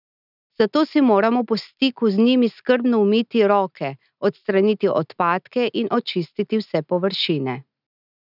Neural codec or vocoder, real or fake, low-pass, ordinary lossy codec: none; real; 5.4 kHz; none